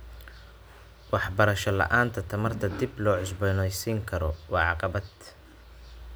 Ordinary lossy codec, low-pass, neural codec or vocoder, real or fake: none; none; none; real